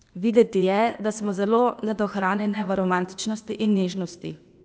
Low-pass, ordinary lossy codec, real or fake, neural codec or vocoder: none; none; fake; codec, 16 kHz, 0.8 kbps, ZipCodec